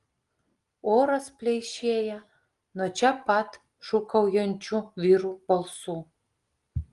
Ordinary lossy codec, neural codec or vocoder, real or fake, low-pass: Opus, 24 kbps; none; real; 10.8 kHz